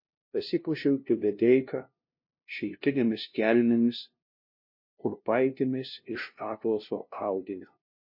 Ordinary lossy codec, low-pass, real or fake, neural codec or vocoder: MP3, 32 kbps; 5.4 kHz; fake; codec, 16 kHz, 0.5 kbps, FunCodec, trained on LibriTTS, 25 frames a second